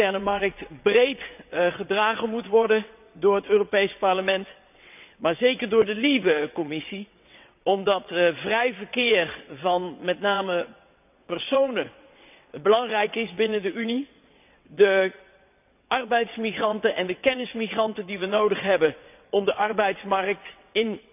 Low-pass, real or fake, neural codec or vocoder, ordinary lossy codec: 3.6 kHz; fake; vocoder, 22.05 kHz, 80 mel bands, WaveNeXt; none